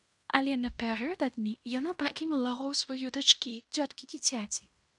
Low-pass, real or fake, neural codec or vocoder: 10.8 kHz; fake; codec, 16 kHz in and 24 kHz out, 0.9 kbps, LongCat-Audio-Codec, fine tuned four codebook decoder